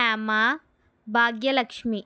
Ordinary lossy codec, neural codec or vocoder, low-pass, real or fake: none; none; none; real